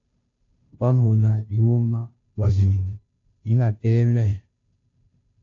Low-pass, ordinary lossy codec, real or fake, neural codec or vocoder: 7.2 kHz; AAC, 48 kbps; fake; codec, 16 kHz, 0.5 kbps, FunCodec, trained on Chinese and English, 25 frames a second